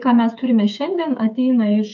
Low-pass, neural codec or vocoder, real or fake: 7.2 kHz; codec, 16 kHz, 8 kbps, FreqCodec, smaller model; fake